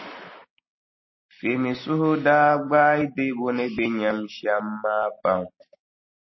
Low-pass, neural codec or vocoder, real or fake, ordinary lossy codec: 7.2 kHz; none; real; MP3, 24 kbps